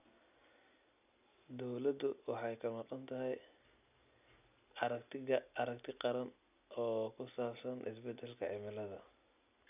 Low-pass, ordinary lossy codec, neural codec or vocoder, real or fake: 3.6 kHz; none; none; real